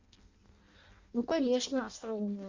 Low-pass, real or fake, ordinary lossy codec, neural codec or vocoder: 7.2 kHz; fake; Opus, 64 kbps; codec, 16 kHz in and 24 kHz out, 0.6 kbps, FireRedTTS-2 codec